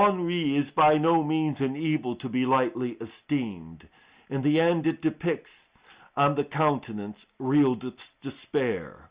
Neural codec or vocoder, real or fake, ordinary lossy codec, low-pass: none; real; Opus, 64 kbps; 3.6 kHz